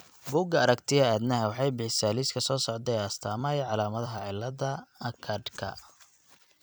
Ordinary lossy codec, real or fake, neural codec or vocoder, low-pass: none; fake; vocoder, 44.1 kHz, 128 mel bands every 256 samples, BigVGAN v2; none